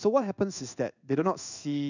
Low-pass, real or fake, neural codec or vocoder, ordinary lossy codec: 7.2 kHz; fake; codec, 16 kHz in and 24 kHz out, 1 kbps, XY-Tokenizer; none